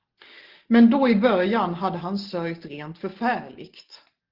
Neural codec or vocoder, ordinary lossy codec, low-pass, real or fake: none; Opus, 16 kbps; 5.4 kHz; real